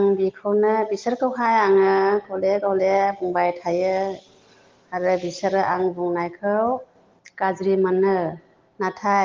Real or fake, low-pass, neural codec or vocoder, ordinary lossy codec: real; 7.2 kHz; none; Opus, 16 kbps